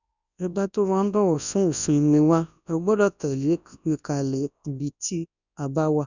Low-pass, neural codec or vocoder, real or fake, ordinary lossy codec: 7.2 kHz; codec, 24 kHz, 0.9 kbps, WavTokenizer, large speech release; fake; none